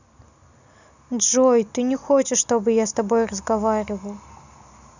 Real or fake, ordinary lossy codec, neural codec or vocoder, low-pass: real; none; none; 7.2 kHz